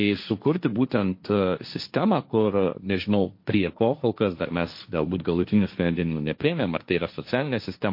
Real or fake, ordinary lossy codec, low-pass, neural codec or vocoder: fake; MP3, 32 kbps; 5.4 kHz; codec, 16 kHz, 1.1 kbps, Voila-Tokenizer